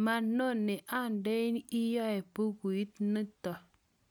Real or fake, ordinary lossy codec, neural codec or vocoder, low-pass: real; none; none; none